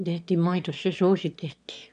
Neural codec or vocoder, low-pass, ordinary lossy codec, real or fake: autoencoder, 22.05 kHz, a latent of 192 numbers a frame, VITS, trained on one speaker; 9.9 kHz; none; fake